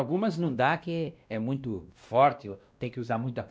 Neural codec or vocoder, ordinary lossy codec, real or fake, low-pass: codec, 16 kHz, 1 kbps, X-Codec, WavLM features, trained on Multilingual LibriSpeech; none; fake; none